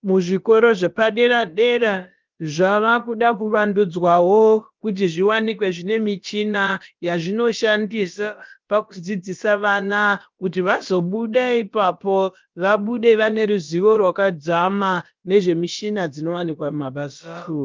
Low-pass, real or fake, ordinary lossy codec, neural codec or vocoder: 7.2 kHz; fake; Opus, 24 kbps; codec, 16 kHz, about 1 kbps, DyCAST, with the encoder's durations